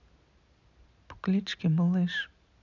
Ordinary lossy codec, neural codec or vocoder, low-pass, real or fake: none; none; 7.2 kHz; real